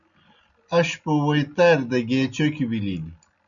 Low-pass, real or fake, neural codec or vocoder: 7.2 kHz; real; none